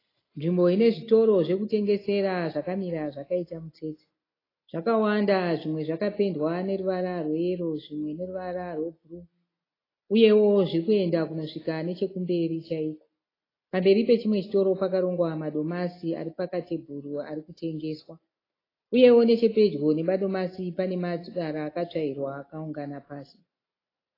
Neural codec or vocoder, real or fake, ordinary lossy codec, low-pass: none; real; AAC, 24 kbps; 5.4 kHz